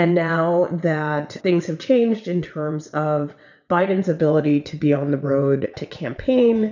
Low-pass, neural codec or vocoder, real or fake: 7.2 kHz; vocoder, 22.05 kHz, 80 mel bands, WaveNeXt; fake